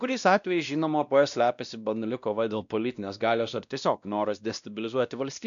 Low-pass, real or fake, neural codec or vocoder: 7.2 kHz; fake; codec, 16 kHz, 1 kbps, X-Codec, WavLM features, trained on Multilingual LibriSpeech